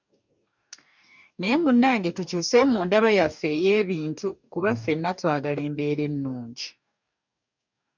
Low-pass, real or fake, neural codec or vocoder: 7.2 kHz; fake; codec, 44.1 kHz, 2.6 kbps, DAC